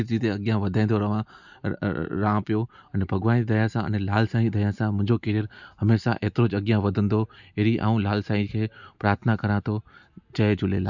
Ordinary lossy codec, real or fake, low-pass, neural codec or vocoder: none; real; 7.2 kHz; none